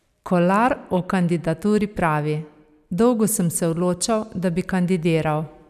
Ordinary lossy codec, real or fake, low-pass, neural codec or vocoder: none; real; 14.4 kHz; none